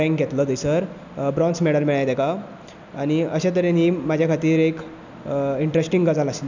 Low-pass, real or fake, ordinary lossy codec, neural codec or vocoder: 7.2 kHz; real; none; none